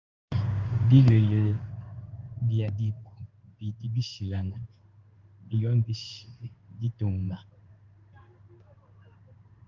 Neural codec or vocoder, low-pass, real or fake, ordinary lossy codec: codec, 16 kHz in and 24 kHz out, 1 kbps, XY-Tokenizer; 7.2 kHz; fake; Opus, 32 kbps